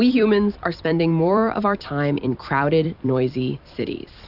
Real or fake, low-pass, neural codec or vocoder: fake; 5.4 kHz; vocoder, 44.1 kHz, 128 mel bands every 256 samples, BigVGAN v2